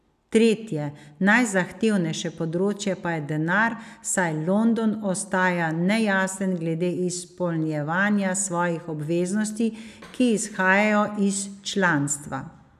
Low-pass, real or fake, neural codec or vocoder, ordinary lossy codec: 14.4 kHz; real; none; none